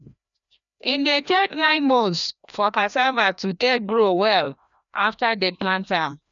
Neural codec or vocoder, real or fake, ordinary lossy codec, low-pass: codec, 16 kHz, 1 kbps, FreqCodec, larger model; fake; none; 7.2 kHz